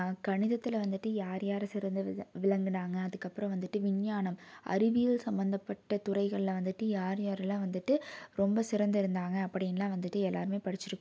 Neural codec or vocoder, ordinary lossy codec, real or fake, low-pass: none; none; real; none